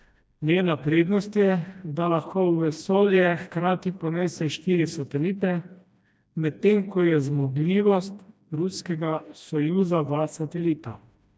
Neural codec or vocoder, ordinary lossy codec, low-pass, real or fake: codec, 16 kHz, 1 kbps, FreqCodec, smaller model; none; none; fake